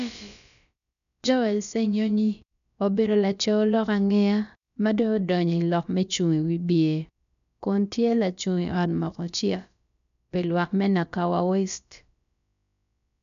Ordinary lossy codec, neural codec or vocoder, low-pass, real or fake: none; codec, 16 kHz, about 1 kbps, DyCAST, with the encoder's durations; 7.2 kHz; fake